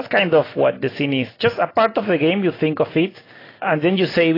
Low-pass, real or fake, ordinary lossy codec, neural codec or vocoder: 5.4 kHz; real; AAC, 24 kbps; none